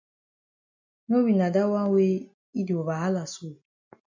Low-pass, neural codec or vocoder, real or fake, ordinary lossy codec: 7.2 kHz; none; real; MP3, 64 kbps